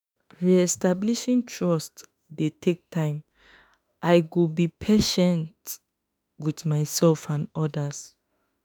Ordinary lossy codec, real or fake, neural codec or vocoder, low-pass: none; fake; autoencoder, 48 kHz, 32 numbers a frame, DAC-VAE, trained on Japanese speech; none